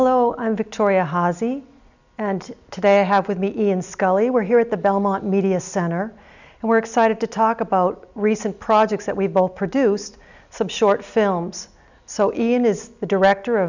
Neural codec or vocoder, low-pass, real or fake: none; 7.2 kHz; real